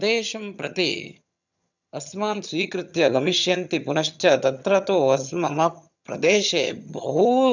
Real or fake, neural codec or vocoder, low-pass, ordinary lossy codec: fake; vocoder, 22.05 kHz, 80 mel bands, HiFi-GAN; 7.2 kHz; none